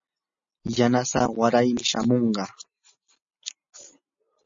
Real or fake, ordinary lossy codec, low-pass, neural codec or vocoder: real; MP3, 32 kbps; 7.2 kHz; none